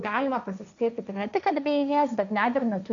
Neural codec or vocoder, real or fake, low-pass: codec, 16 kHz, 1.1 kbps, Voila-Tokenizer; fake; 7.2 kHz